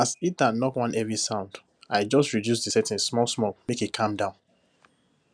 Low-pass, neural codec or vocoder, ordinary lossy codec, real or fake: 9.9 kHz; none; none; real